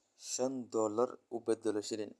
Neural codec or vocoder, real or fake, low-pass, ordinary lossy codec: none; real; none; none